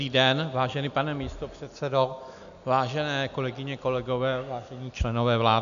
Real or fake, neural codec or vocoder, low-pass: real; none; 7.2 kHz